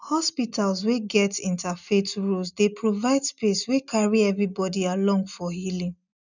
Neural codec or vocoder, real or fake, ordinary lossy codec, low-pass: none; real; none; 7.2 kHz